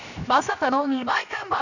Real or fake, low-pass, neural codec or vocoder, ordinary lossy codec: fake; 7.2 kHz; codec, 16 kHz, 0.7 kbps, FocalCodec; none